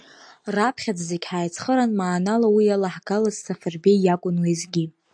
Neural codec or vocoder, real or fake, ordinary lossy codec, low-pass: none; real; AAC, 64 kbps; 9.9 kHz